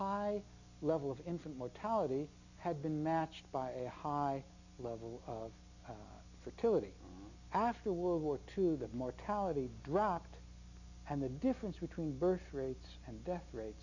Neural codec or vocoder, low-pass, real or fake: none; 7.2 kHz; real